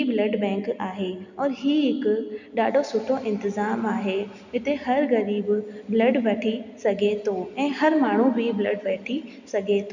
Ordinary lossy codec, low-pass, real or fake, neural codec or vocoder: none; 7.2 kHz; real; none